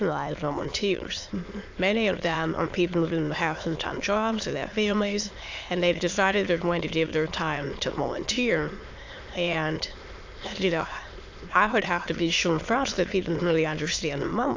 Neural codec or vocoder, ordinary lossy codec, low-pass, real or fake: autoencoder, 22.05 kHz, a latent of 192 numbers a frame, VITS, trained on many speakers; AAC, 48 kbps; 7.2 kHz; fake